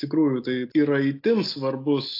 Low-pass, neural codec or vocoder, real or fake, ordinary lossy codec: 5.4 kHz; none; real; AAC, 32 kbps